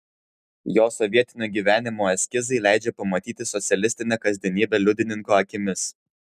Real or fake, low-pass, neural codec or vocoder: real; 14.4 kHz; none